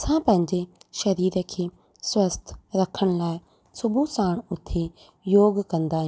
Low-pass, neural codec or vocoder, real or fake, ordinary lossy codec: none; none; real; none